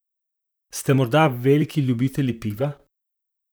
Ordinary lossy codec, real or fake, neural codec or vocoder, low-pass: none; fake; vocoder, 44.1 kHz, 128 mel bands, Pupu-Vocoder; none